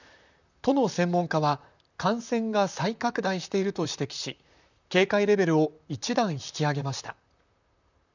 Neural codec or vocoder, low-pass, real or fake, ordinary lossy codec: vocoder, 44.1 kHz, 128 mel bands, Pupu-Vocoder; 7.2 kHz; fake; none